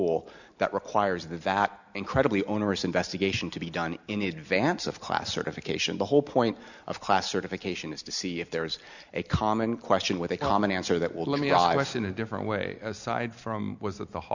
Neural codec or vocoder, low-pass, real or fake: none; 7.2 kHz; real